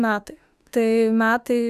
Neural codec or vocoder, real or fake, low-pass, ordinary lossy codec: autoencoder, 48 kHz, 32 numbers a frame, DAC-VAE, trained on Japanese speech; fake; 14.4 kHz; Opus, 64 kbps